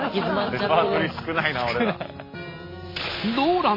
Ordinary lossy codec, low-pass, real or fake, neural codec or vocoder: MP3, 24 kbps; 5.4 kHz; real; none